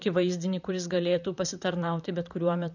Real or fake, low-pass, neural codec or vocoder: fake; 7.2 kHz; vocoder, 44.1 kHz, 128 mel bands, Pupu-Vocoder